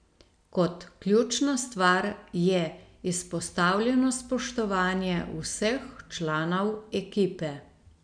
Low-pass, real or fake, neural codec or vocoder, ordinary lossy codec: 9.9 kHz; real; none; none